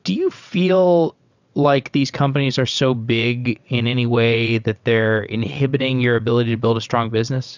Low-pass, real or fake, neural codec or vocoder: 7.2 kHz; fake; vocoder, 22.05 kHz, 80 mel bands, WaveNeXt